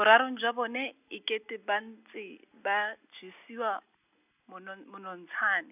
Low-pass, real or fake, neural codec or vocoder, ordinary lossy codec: 3.6 kHz; real; none; none